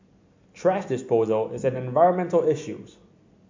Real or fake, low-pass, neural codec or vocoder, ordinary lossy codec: real; 7.2 kHz; none; MP3, 48 kbps